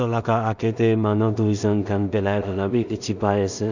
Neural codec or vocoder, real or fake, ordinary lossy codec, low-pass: codec, 16 kHz in and 24 kHz out, 0.4 kbps, LongCat-Audio-Codec, two codebook decoder; fake; none; 7.2 kHz